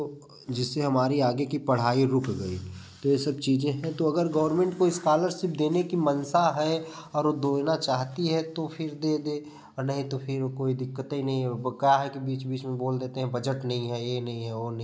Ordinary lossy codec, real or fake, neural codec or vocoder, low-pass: none; real; none; none